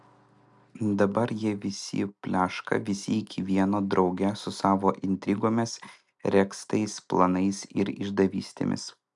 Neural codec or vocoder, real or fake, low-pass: none; real; 10.8 kHz